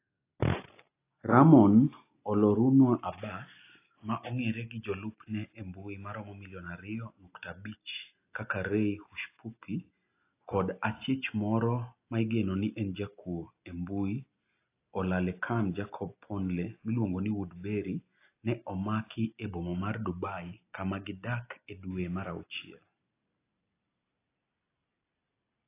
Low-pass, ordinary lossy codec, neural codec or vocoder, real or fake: 3.6 kHz; AAC, 24 kbps; none; real